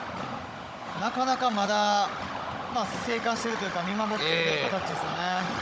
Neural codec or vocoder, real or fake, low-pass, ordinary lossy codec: codec, 16 kHz, 16 kbps, FunCodec, trained on Chinese and English, 50 frames a second; fake; none; none